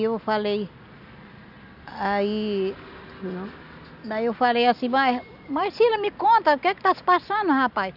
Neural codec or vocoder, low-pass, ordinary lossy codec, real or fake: none; 5.4 kHz; none; real